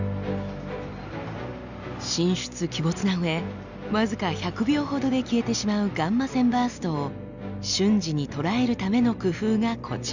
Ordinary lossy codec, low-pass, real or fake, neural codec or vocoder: none; 7.2 kHz; real; none